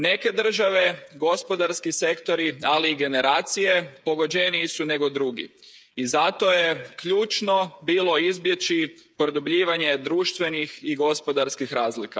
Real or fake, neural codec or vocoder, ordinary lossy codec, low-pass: fake; codec, 16 kHz, 8 kbps, FreqCodec, smaller model; none; none